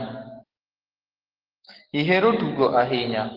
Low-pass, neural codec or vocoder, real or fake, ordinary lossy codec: 5.4 kHz; codec, 44.1 kHz, 7.8 kbps, DAC; fake; Opus, 16 kbps